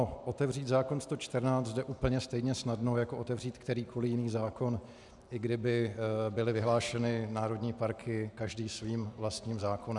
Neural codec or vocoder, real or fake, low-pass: none; real; 10.8 kHz